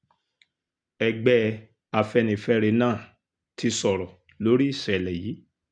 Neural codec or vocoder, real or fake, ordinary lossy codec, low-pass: none; real; none; 9.9 kHz